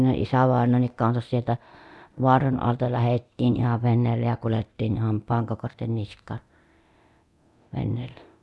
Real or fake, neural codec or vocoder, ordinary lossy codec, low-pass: fake; codec, 24 kHz, 0.9 kbps, DualCodec; none; none